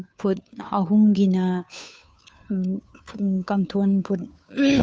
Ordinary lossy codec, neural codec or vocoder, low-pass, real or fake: none; codec, 16 kHz, 2 kbps, FunCodec, trained on Chinese and English, 25 frames a second; none; fake